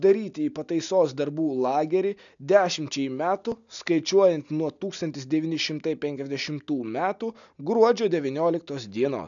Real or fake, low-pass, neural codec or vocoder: real; 7.2 kHz; none